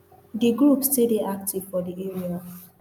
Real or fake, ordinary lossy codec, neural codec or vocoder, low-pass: real; none; none; 19.8 kHz